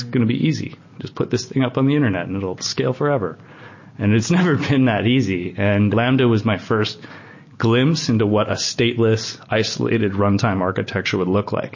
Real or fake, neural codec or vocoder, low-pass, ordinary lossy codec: real; none; 7.2 kHz; MP3, 32 kbps